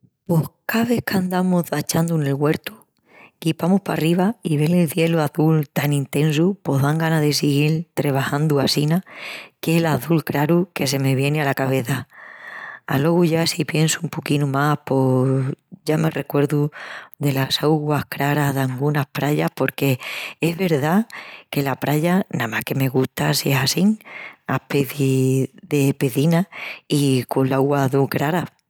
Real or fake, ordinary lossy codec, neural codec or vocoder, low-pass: real; none; none; none